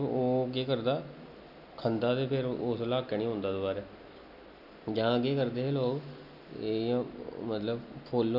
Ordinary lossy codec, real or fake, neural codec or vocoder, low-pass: none; real; none; 5.4 kHz